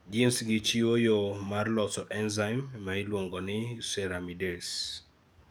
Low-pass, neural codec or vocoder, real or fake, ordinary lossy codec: none; codec, 44.1 kHz, 7.8 kbps, DAC; fake; none